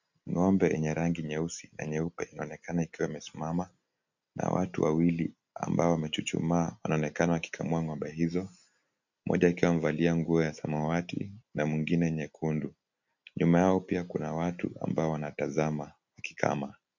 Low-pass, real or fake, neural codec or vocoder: 7.2 kHz; real; none